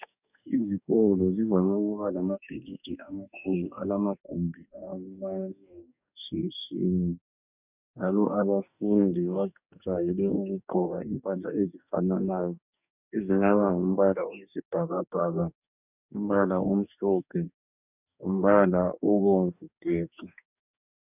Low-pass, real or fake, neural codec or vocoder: 3.6 kHz; fake; codec, 44.1 kHz, 2.6 kbps, DAC